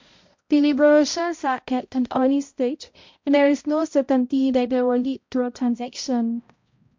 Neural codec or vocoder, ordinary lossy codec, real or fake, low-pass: codec, 16 kHz, 0.5 kbps, X-Codec, HuBERT features, trained on balanced general audio; MP3, 48 kbps; fake; 7.2 kHz